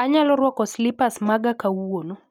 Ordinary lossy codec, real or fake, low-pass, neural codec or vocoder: none; real; 19.8 kHz; none